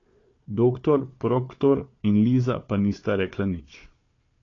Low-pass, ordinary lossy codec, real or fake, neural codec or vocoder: 7.2 kHz; AAC, 32 kbps; fake; codec, 16 kHz, 4 kbps, FunCodec, trained on Chinese and English, 50 frames a second